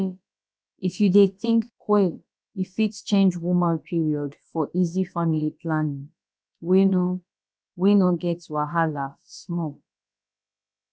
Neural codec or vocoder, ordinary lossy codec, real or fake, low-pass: codec, 16 kHz, about 1 kbps, DyCAST, with the encoder's durations; none; fake; none